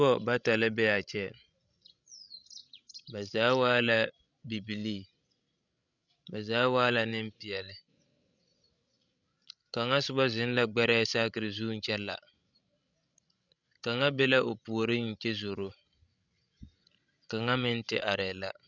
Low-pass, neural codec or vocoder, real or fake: 7.2 kHz; codec, 16 kHz, 16 kbps, FreqCodec, larger model; fake